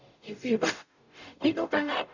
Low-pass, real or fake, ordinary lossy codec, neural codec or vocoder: 7.2 kHz; fake; none; codec, 44.1 kHz, 0.9 kbps, DAC